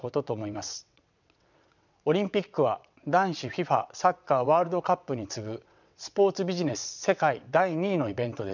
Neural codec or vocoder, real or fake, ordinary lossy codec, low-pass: vocoder, 22.05 kHz, 80 mel bands, WaveNeXt; fake; none; 7.2 kHz